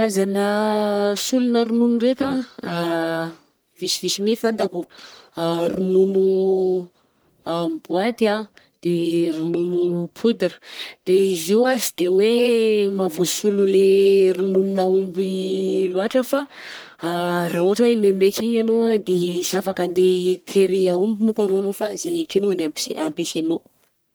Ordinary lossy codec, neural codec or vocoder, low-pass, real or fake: none; codec, 44.1 kHz, 1.7 kbps, Pupu-Codec; none; fake